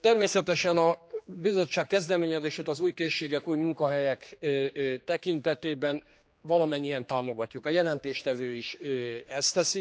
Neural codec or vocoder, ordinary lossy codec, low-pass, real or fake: codec, 16 kHz, 2 kbps, X-Codec, HuBERT features, trained on general audio; none; none; fake